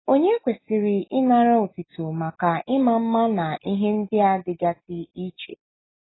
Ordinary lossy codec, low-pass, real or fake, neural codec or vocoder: AAC, 16 kbps; 7.2 kHz; real; none